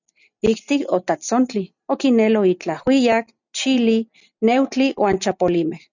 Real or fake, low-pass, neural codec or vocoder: real; 7.2 kHz; none